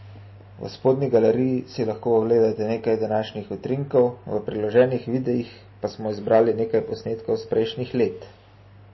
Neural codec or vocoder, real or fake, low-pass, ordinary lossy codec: none; real; 7.2 kHz; MP3, 24 kbps